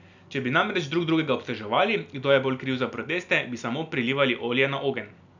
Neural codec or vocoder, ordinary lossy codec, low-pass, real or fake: none; none; 7.2 kHz; real